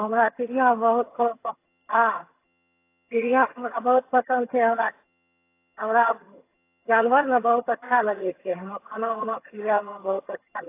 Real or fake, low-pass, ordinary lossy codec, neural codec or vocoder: fake; 3.6 kHz; AAC, 24 kbps; vocoder, 22.05 kHz, 80 mel bands, HiFi-GAN